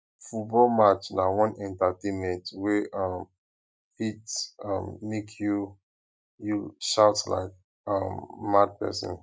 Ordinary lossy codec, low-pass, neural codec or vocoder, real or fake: none; none; none; real